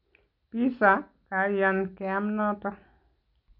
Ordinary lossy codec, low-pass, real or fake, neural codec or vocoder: none; 5.4 kHz; real; none